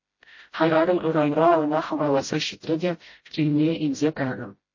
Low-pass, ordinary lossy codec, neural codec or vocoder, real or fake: 7.2 kHz; MP3, 32 kbps; codec, 16 kHz, 0.5 kbps, FreqCodec, smaller model; fake